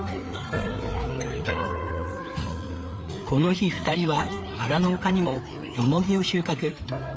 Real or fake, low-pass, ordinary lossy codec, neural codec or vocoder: fake; none; none; codec, 16 kHz, 4 kbps, FreqCodec, larger model